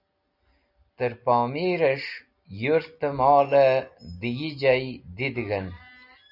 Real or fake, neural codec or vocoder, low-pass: real; none; 5.4 kHz